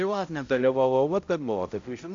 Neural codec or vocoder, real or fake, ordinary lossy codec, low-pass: codec, 16 kHz, 0.5 kbps, X-Codec, HuBERT features, trained on balanced general audio; fake; AAC, 64 kbps; 7.2 kHz